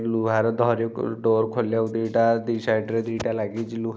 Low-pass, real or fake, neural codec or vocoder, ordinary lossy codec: none; real; none; none